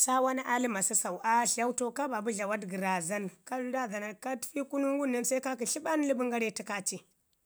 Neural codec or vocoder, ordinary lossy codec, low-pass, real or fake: none; none; none; real